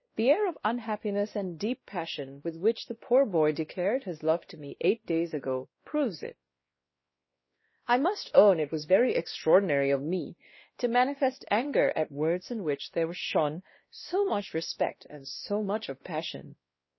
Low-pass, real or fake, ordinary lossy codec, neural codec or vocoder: 7.2 kHz; fake; MP3, 24 kbps; codec, 16 kHz, 1 kbps, X-Codec, WavLM features, trained on Multilingual LibriSpeech